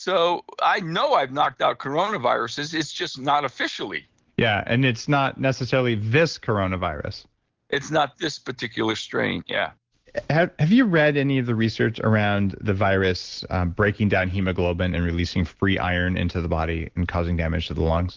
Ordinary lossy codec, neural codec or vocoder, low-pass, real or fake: Opus, 16 kbps; none; 7.2 kHz; real